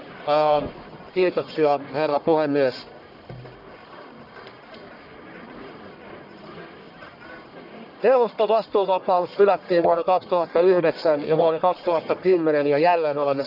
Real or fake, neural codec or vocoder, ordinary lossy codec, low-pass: fake; codec, 44.1 kHz, 1.7 kbps, Pupu-Codec; none; 5.4 kHz